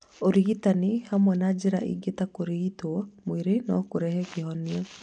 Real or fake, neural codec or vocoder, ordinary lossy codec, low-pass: real; none; none; 9.9 kHz